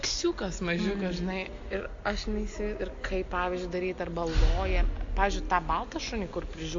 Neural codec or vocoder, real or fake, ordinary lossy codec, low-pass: none; real; AAC, 48 kbps; 7.2 kHz